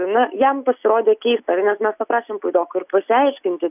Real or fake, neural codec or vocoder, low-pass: real; none; 3.6 kHz